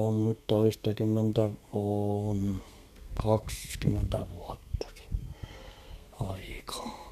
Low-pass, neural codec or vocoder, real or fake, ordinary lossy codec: 14.4 kHz; codec, 32 kHz, 1.9 kbps, SNAC; fake; none